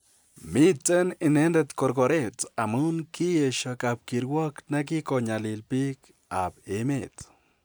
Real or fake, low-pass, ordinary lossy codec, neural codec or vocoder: real; none; none; none